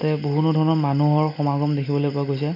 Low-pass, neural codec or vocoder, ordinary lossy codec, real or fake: 5.4 kHz; none; MP3, 48 kbps; real